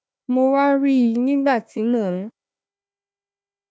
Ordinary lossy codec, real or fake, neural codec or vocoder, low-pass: none; fake; codec, 16 kHz, 1 kbps, FunCodec, trained on Chinese and English, 50 frames a second; none